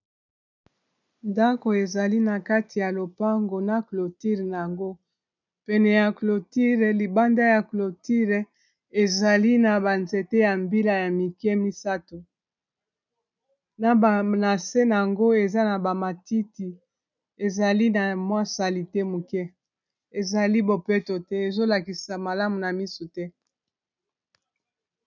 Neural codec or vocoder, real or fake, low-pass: none; real; 7.2 kHz